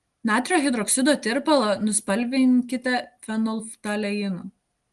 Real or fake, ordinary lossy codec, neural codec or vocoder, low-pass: real; Opus, 24 kbps; none; 10.8 kHz